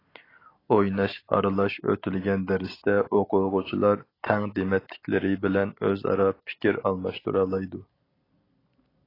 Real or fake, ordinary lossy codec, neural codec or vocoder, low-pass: real; AAC, 24 kbps; none; 5.4 kHz